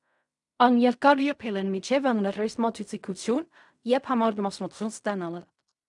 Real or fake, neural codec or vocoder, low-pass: fake; codec, 16 kHz in and 24 kHz out, 0.4 kbps, LongCat-Audio-Codec, fine tuned four codebook decoder; 10.8 kHz